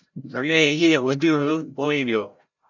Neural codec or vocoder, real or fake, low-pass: codec, 16 kHz, 0.5 kbps, FreqCodec, larger model; fake; 7.2 kHz